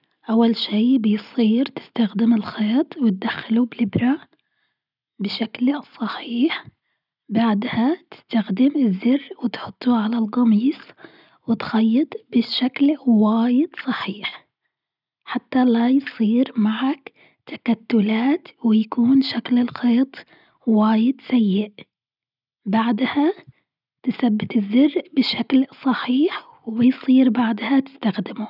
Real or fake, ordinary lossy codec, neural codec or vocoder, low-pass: real; none; none; 5.4 kHz